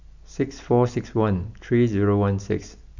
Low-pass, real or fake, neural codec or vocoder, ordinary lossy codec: 7.2 kHz; real; none; none